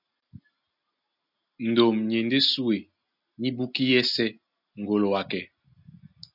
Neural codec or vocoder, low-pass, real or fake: none; 5.4 kHz; real